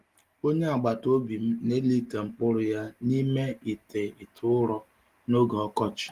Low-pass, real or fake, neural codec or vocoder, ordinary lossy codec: 14.4 kHz; real; none; Opus, 24 kbps